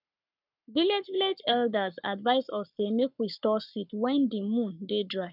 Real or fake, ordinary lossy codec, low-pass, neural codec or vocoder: fake; none; 5.4 kHz; codec, 44.1 kHz, 7.8 kbps, Pupu-Codec